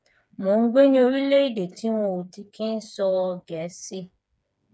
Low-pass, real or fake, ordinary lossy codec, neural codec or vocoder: none; fake; none; codec, 16 kHz, 4 kbps, FreqCodec, smaller model